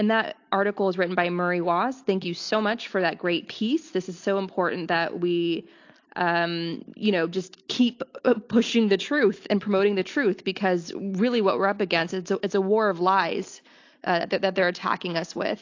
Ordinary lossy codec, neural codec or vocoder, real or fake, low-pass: AAC, 48 kbps; none; real; 7.2 kHz